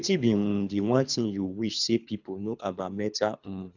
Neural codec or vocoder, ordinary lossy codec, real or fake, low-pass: codec, 24 kHz, 3 kbps, HILCodec; none; fake; 7.2 kHz